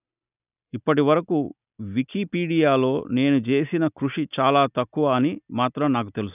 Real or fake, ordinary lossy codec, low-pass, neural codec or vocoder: real; none; 3.6 kHz; none